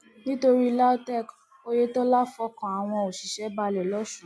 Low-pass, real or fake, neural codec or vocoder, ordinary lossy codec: none; real; none; none